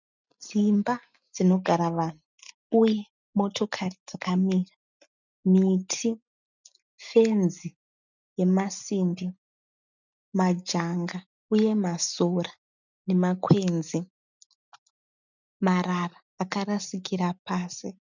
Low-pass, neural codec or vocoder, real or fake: 7.2 kHz; none; real